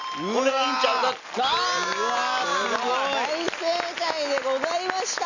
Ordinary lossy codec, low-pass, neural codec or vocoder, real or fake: none; 7.2 kHz; none; real